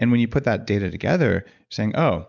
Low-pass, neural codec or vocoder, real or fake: 7.2 kHz; none; real